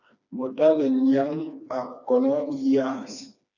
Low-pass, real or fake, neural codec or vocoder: 7.2 kHz; fake; codec, 16 kHz, 2 kbps, FreqCodec, smaller model